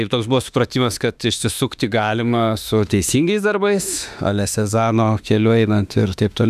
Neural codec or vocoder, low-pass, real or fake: autoencoder, 48 kHz, 32 numbers a frame, DAC-VAE, trained on Japanese speech; 14.4 kHz; fake